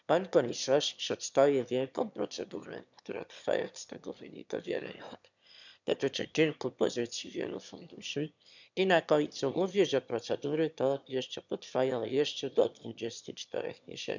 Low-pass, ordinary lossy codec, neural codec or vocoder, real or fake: 7.2 kHz; none; autoencoder, 22.05 kHz, a latent of 192 numbers a frame, VITS, trained on one speaker; fake